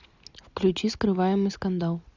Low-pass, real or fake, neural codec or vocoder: 7.2 kHz; real; none